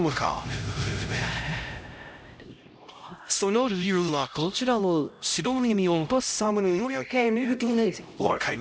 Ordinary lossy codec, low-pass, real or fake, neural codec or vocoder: none; none; fake; codec, 16 kHz, 0.5 kbps, X-Codec, HuBERT features, trained on LibriSpeech